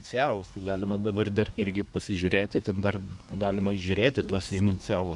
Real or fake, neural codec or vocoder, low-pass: fake; codec, 24 kHz, 1 kbps, SNAC; 10.8 kHz